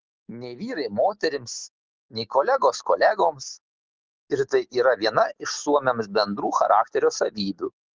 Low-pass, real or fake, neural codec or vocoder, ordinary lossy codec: 7.2 kHz; real; none; Opus, 16 kbps